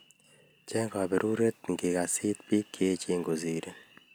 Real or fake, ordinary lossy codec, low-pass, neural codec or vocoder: real; none; none; none